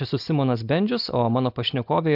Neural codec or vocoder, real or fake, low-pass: none; real; 5.4 kHz